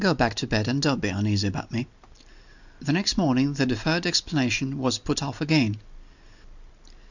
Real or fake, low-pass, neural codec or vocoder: real; 7.2 kHz; none